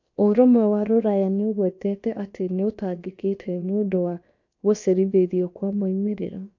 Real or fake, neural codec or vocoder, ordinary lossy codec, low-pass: fake; codec, 16 kHz, about 1 kbps, DyCAST, with the encoder's durations; AAC, 48 kbps; 7.2 kHz